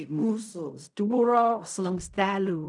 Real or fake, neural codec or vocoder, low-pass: fake; codec, 16 kHz in and 24 kHz out, 0.4 kbps, LongCat-Audio-Codec, fine tuned four codebook decoder; 10.8 kHz